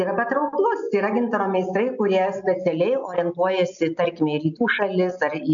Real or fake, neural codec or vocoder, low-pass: real; none; 7.2 kHz